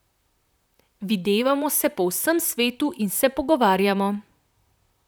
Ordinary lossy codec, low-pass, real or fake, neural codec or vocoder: none; none; fake; vocoder, 44.1 kHz, 128 mel bands, Pupu-Vocoder